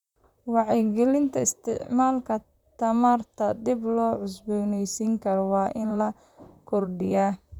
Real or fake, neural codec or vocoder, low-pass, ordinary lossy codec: fake; vocoder, 44.1 kHz, 128 mel bands, Pupu-Vocoder; 19.8 kHz; none